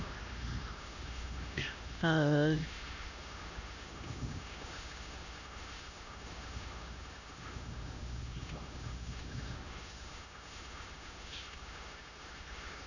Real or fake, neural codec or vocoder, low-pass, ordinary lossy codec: fake; codec, 16 kHz, 1 kbps, X-Codec, HuBERT features, trained on LibriSpeech; 7.2 kHz; none